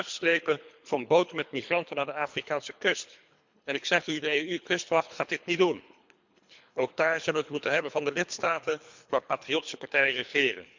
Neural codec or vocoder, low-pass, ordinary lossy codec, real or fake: codec, 24 kHz, 3 kbps, HILCodec; 7.2 kHz; MP3, 64 kbps; fake